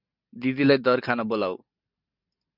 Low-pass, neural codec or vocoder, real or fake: 5.4 kHz; none; real